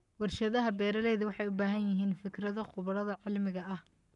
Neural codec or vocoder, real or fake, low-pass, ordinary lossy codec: codec, 44.1 kHz, 7.8 kbps, Pupu-Codec; fake; 10.8 kHz; none